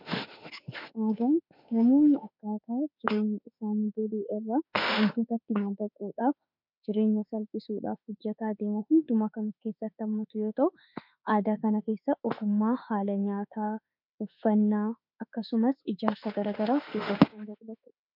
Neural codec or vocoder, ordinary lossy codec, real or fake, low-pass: autoencoder, 48 kHz, 32 numbers a frame, DAC-VAE, trained on Japanese speech; MP3, 48 kbps; fake; 5.4 kHz